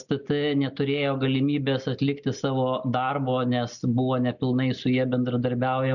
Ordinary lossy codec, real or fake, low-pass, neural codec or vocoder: MP3, 64 kbps; real; 7.2 kHz; none